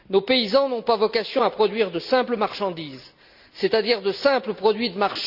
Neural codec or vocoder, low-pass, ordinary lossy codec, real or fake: none; 5.4 kHz; none; real